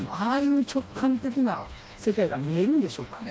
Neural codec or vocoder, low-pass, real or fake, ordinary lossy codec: codec, 16 kHz, 1 kbps, FreqCodec, smaller model; none; fake; none